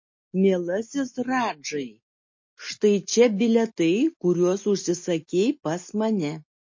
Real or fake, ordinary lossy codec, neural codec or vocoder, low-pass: real; MP3, 32 kbps; none; 7.2 kHz